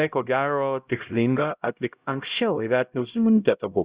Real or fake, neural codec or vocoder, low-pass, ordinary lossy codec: fake; codec, 16 kHz, 0.5 kbps, X-Codec, HuBERT features, trained on LibriSpeech; 3.6 kHz; Opus, 64 kbps